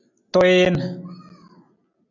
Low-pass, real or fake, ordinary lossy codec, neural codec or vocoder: 7.2 kHz; real; AAC, 48 kbps; none